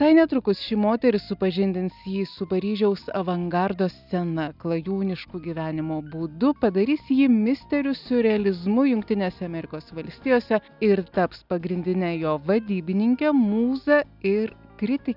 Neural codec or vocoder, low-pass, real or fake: none; 5.4 kHz; real